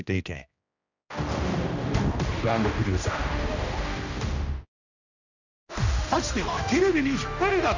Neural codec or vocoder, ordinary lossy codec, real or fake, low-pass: codec, 16 kHz, 1 kbps, X-Codec, HuBERT features, trained on general audio; none; fake; 7.2 kHz